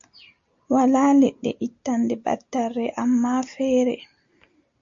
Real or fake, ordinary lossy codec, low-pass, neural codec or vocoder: real; MP3, 48 kbps; 7.2 kHz; none